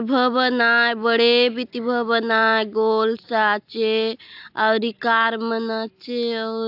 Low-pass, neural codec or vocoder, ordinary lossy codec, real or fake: 5.4 kHz; none; AAC, 48 kbps; real